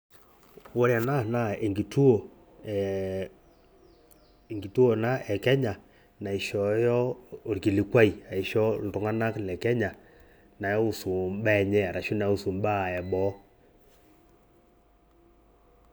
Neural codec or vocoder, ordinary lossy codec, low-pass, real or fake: none; none; none; real